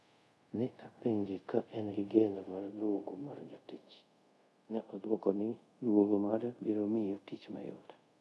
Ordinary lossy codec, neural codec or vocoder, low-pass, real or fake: none; codec, 24 kHz, 0.5 kbps, DualCodec; none; fake